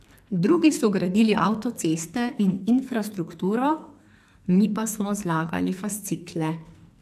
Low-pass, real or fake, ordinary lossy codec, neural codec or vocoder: 14.4 kHz; fake; AAC, 96 kbps; codec, 44.1 kHz, 2.6 kbps, SNAC